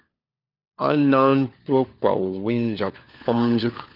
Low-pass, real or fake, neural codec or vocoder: 5.4 kHz; fake; codec, 16 kHz, 4 kbps, FunCodec, trained on LibriTTS, 50 frames a second